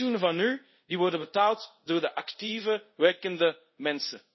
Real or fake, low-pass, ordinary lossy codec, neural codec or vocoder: fake; 7.2 kHz; MP3, 24 kbps; codec, 24 kHz, 0.5 kbps, DualCodec